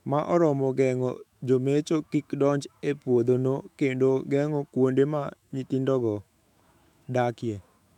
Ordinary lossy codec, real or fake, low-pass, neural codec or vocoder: none; fake; 19.8 kHz; autoencoder, 48 kHz, 128 numbers a frame, DAC-VAE, trained on Japanese speech